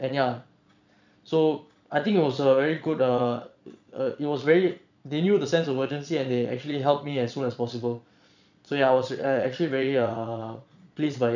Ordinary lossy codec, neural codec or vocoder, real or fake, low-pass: none; vocoder, 22.05 kHz, 80 mel bands, Vocos; fake; 7.2 kHz